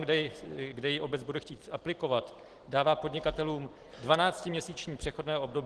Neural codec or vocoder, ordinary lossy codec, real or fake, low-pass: none; Opus, 16 kbps; real; 10.8 kHz